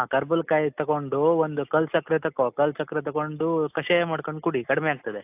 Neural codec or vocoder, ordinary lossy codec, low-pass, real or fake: none; AAC, 32 kbps; 3.6 kHz; real